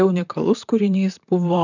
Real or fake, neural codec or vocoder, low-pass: fake; vocoder, 44.1 kHz, 128 mel bands, Pupu-Vocoder; 7.2 kHz